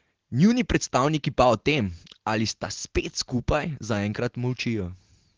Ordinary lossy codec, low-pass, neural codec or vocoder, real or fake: Opus, 16 kbps; 7.2 kHz; none; real